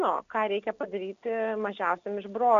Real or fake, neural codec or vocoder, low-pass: real; none; 7.2 kHz